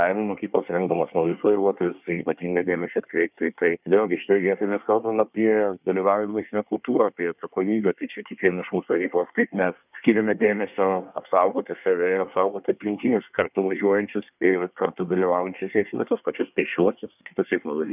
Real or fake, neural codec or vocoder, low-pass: fake; codec, 24 kHz, 1 kbps, SNAC; 3.6 kHz